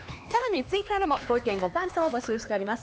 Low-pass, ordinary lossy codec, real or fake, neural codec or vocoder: none; none; fake; codec, 16 kHz, 2 kbps, X-Codec, HuBERT features, trained on LibriSpeech